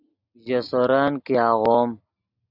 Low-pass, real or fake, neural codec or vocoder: 5.4 kHz; real; none